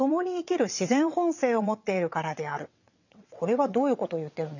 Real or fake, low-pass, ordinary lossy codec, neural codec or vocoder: fake; 7.2 kHz; none; vocoder, 22.05 kHz, 80 mel bands, WaveNeXt